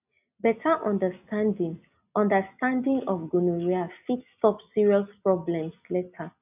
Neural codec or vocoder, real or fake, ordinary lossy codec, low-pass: none; real; none; 3.6 kHz